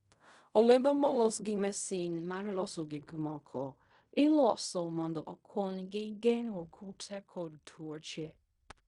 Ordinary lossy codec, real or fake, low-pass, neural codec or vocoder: Opus, 64 kbps; fake; 10.8 kHz; codec, 16 kHz in and 24 kHz out, 0.4 kbps, LongCat-Audio-Codec, fine tuned four codebook decoder